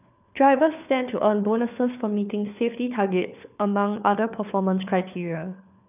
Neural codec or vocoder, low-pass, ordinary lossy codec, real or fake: codec, 16 kHz, 4 kbps, FunCodec, trained on LibriTTS, 50 frames a second; 3.6 kHz; none; fake